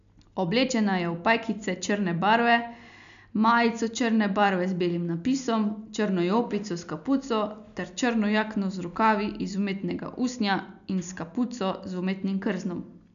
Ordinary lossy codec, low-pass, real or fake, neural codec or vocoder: none; 7.2 kHz; real; none